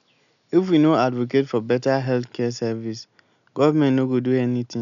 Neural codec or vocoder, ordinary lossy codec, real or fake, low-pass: none; none; real; 7.2 kHz